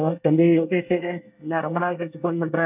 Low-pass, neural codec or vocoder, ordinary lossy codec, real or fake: 3.6 kHz; codec, 24 kHz, 1 kbps, SNAC; none; fake